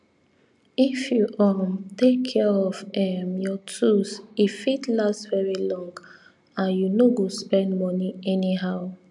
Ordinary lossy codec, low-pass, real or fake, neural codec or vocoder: none; 10.8 kHz; real; none